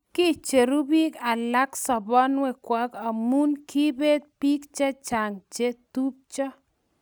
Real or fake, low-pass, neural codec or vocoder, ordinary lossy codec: real; none; none; none